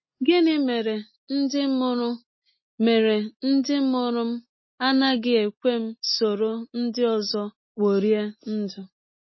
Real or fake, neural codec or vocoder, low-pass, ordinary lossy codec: real; none; 7.2 kHz; MP3, 24 kbps